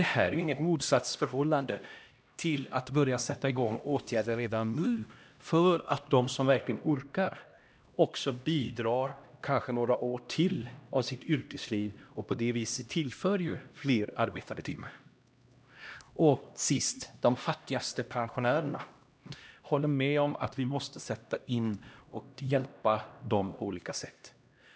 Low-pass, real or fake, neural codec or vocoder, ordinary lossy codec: none; fake; codec, 16 kHz, 1 kbps, X-Codec, HuBERT features, trained on LibriSpeech; none